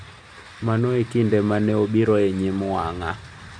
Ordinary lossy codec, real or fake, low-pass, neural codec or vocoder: none; real; 9.9 kHz; none